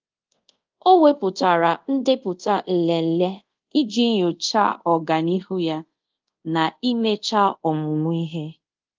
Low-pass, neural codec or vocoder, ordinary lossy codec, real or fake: 7.2 kHz; codec, 24 kHz, 0.5 kbps, DualCodec; Opus, 32 kbps; fake